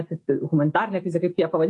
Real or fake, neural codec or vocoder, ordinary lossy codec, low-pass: real; none; AAC, 48 kbps; 10.8 kHz